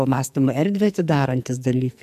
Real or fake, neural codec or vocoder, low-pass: fake; codec, 44.1 kHz, 2.6 kbps, SNAC; 14.4 kHz